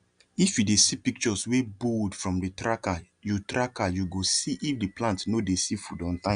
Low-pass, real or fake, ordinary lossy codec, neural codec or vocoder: 9.9 kHz; real; none; none